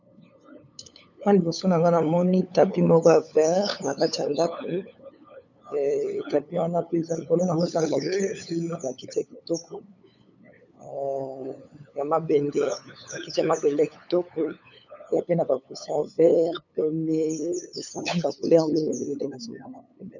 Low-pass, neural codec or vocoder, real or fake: 7.2 kHz; codec, 16 kHz, 8 kbps, FunCodec, trained on LibriTTS, 25 frames a second; fake